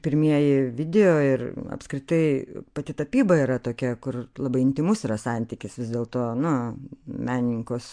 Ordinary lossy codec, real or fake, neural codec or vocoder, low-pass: MP3, 64 kbps; real; none; 9.9 kHz